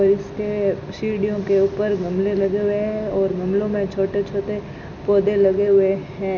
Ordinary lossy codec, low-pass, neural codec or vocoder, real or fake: none; 7.2 kHz; none; real